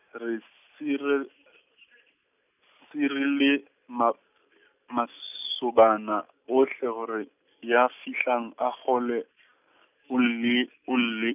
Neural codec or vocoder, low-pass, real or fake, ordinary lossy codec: none; 3.6 kHz; real; none